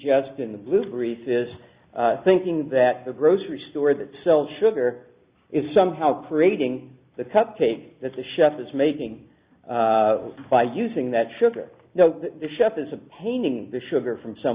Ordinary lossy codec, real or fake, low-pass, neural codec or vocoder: Opus, 64 kbps; real; 3.6 kHz; none